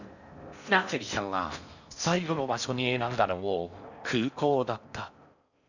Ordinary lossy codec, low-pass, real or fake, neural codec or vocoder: none; 7.2 kHz; fake; codec, 16 kHz in and 24 kHz out, 0.6 kbps, FocalCodec, streaming, 4096 codes